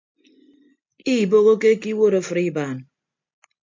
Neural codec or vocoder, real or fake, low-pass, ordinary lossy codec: none; real; 7.2 kHz; MP3, 64 kbps